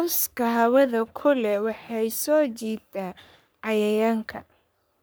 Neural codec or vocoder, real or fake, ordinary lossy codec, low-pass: codec, 44.1 kHz, 3.4 kbps, Pupu-Codec; fake; none; none